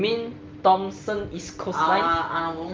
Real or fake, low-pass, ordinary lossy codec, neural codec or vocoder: real; 7.2 kHz; Opus, 32 kbps; none